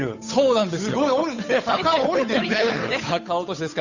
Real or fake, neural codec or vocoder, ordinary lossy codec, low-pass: fake; codec, 16 kHz, 8 kbps, FunCodec, trained on Chinese and English, 25 frames a second; none; 7.2 kHz